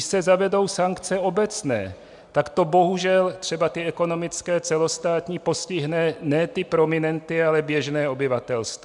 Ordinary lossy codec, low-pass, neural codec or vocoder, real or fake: MP3, 96 kbps; 10.8 kHz; none; real